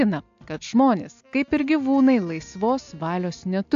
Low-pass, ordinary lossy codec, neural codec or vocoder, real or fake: 7.2 kHz; AAC, 64 kbps; none; real